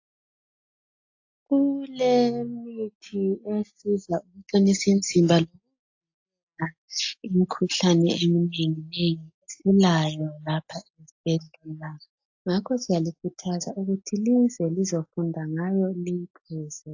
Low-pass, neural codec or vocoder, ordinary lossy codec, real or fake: 7.2 kHz; none; AAC, 48 kbps; real